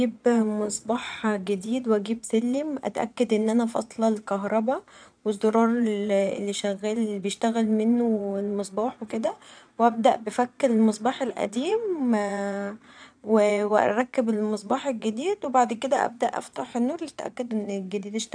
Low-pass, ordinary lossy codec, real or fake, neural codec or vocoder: 9.9 kHz; none; fake; vocoder, 22.05 kHz, 80 mel bands, Vocos